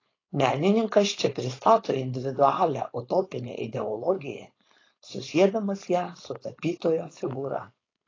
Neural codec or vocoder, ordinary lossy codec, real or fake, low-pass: codec, 16 kHz, 4.8 kbps, FACodec; AAC, 32 kbps; fake; 7.2 kHz